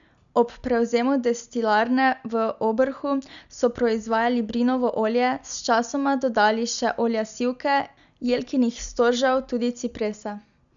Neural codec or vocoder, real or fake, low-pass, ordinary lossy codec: none; real; 7.2 kHz; none